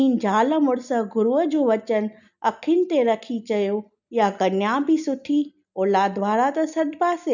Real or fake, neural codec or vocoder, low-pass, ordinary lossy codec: real; none; 7.2 kHz; none